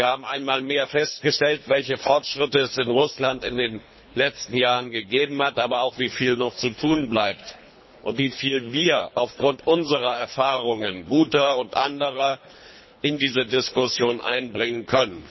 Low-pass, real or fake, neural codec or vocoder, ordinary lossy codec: 7.2 kHz; fake; codec, 24 kHz, 3 kbps, HILCodec; MP3, 24 kbps